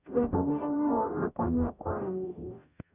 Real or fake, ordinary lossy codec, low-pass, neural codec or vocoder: fake; none; 3.6 kHz; codec, 44.1 kHz, 0.9 kbps, DAC